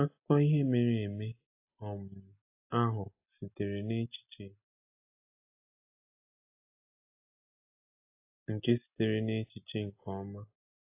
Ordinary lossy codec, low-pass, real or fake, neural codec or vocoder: AAC, 32 kbps; 3.6 kHz; real; none